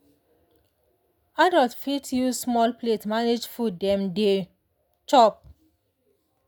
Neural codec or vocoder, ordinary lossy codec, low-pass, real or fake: none; none; none; real